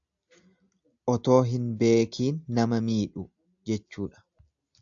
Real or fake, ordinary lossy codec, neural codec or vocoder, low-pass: real; AAC, 64 kbps; none; 7.2 kHz